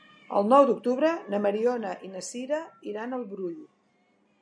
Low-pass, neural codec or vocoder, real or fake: 9.9 kHz; none; real